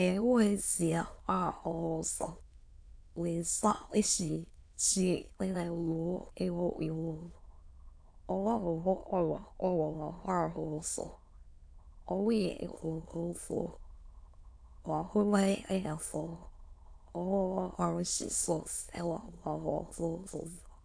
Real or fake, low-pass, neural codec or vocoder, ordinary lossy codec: fake; 9.9 kHz; autoencoder, 22.05 kHz, a latent of 192 numbers a frame, VITS, trained on many speakers; AAC, 64 kbps